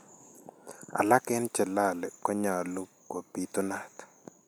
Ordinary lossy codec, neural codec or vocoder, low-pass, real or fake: none; none; none; real